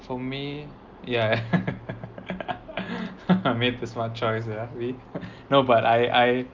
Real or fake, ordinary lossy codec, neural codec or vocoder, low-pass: real; Opus, 32 kbps; none; 7.2 kHz